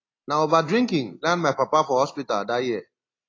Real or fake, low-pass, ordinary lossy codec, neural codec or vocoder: real; 7.2 kHz; AAC, 32 kbps; none